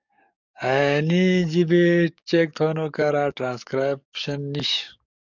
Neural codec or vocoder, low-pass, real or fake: codec, 44.1 kHz, 7.8 kbps, DAC; 7.2 kHz; fake